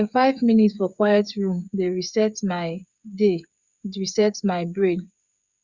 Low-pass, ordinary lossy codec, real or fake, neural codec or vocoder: 7.2 kHz; Opus, 64 kbps; fake; codec, 16 kHz, 8 kbps, FreqCodec, smaller model